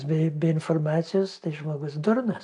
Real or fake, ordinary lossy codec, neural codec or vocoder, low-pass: real; AAC, 64 kbps; none; 10.8 kHz